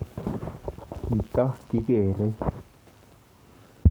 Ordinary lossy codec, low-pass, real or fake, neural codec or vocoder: none; none; fake; codec, 44.1 kHz, 7.8 kbps, Pupu-Codec